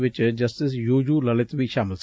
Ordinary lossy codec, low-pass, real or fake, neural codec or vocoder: none; none; real; none